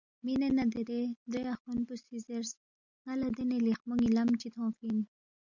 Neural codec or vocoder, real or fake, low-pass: none; real; 7.2 kHz